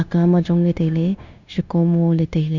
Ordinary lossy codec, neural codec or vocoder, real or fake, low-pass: none; codec, 16 kHz, 0.9 kbps, LongCat-Audio-Codec; fake; 7.2 kHz